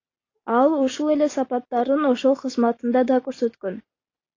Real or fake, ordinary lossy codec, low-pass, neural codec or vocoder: real; AAC, 32 kbps; 7.2 kHz; none